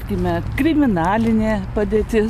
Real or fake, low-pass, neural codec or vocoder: real; 14.4 kHz; none